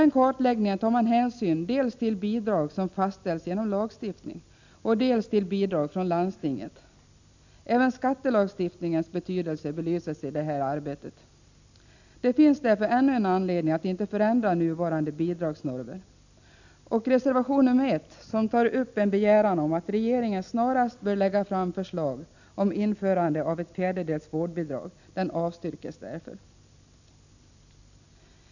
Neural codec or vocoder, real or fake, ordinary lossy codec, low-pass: none; real; none; 7.2 kHz